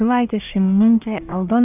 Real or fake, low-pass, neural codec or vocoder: fake; 3.6 kHz; codec, 44.1 kHz, 1.7 kbps, Pupu-Codec